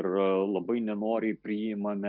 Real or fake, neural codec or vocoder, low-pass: real; none; 5.4 kHz